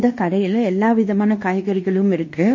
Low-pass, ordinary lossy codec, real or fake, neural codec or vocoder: 7.2 kHz; MP3, 32 kbps; fake; codec, 16 kHz in and 24 kHz out, 0.9 kbps, LongCat-Audio-Codec, fine tuned four codebook decoder